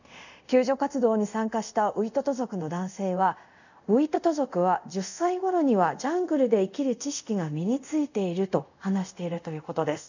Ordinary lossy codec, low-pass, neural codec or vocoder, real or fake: none; 7.2 kHz; codec, 24 kHz, 0.5 kbps, DualCodec; fake